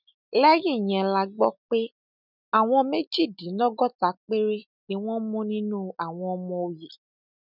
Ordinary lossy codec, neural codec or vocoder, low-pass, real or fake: none; none; 5.4 kHz; real